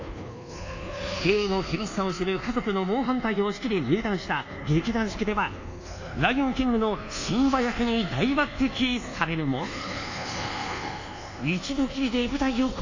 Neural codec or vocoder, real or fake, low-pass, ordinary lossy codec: codec, 24 kHz, 1.2 kbps, DualCodec; fake; 7.2 kHz; AAC, 48 kbps